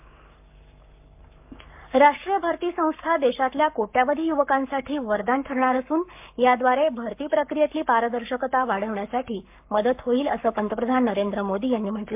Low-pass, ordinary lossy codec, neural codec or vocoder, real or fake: 3.6 kHz; MP3, 32 kbps; codec, 44.1 kHz, 7.8 kbps, Pupu-Codec; fake